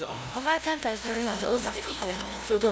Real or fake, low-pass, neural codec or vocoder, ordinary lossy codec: fake; none; codec, 16 kHz, 0.5 kbps, FunCodec, trained on LibriTTS, 25 frames a second; none